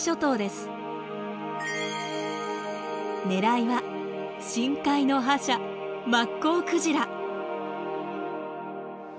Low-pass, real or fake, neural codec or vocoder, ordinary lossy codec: none; real; none; none